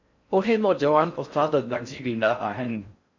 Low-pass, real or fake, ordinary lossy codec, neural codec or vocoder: 7.2 kHz; fake; MP3, 64 kbps; codec, 16 kHz in and 24 kHz out, 0.6 kbps, FocalCodec, streaming, 4096 codes